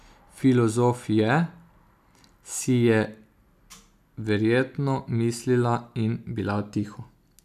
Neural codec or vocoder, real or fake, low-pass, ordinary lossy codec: none; real; 14.4 kHz; none